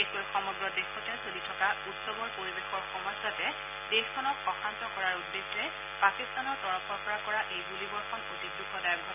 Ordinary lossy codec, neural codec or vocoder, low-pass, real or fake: none; none; 3.6 kHz; real